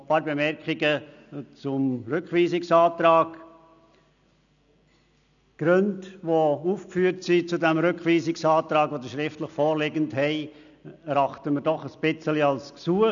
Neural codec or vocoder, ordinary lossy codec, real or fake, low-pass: none; none; real; 7.2 kHz